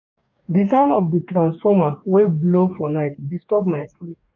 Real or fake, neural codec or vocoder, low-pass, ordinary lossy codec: fake; codec, 44.1 kHz, 2.6 kbps, DAC; 7.2 kHz; none